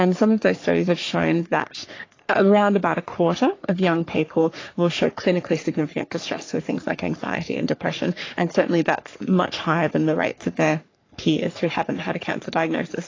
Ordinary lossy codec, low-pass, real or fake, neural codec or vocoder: AAC, 32 kbps; 7.2 kHz; fake; codec, 44.1 kHz, 3.4 kbps, Pupu-Codec